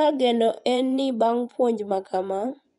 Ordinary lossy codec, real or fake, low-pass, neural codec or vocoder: MP3, 96 kbps; fake; 10.8 kHz; vocoder, 24 kHz, 100 mel bands, Vocos